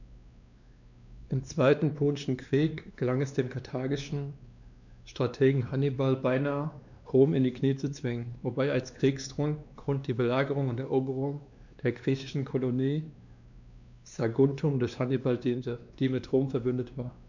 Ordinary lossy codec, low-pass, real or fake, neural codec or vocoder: none; 7.2 kHz; fake; codec, 16 kHz, 2 kbps, X-Codec, WavLM features, trained on Multilingual LibriSpeech